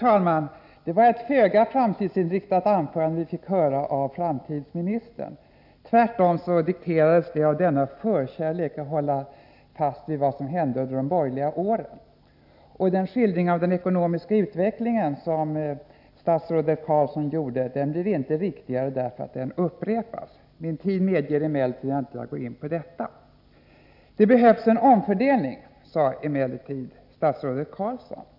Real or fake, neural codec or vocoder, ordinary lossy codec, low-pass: real; none; none; 5.4 kHz